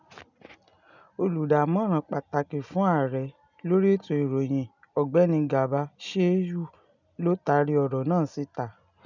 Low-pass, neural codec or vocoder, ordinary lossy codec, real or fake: 7.2 kHz; none; none; real